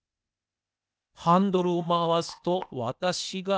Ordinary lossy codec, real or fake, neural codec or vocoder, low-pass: none; fake; codec, 16 kHz, 0.8 kbps, ZipCodec; none